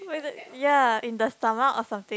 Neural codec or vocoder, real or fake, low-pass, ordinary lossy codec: none; real; none; none